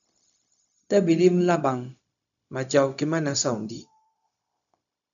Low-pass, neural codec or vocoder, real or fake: 7.2 kHz; codec, 16 kHz, 0.4 kbps, LongCat-Audio-Codec; fake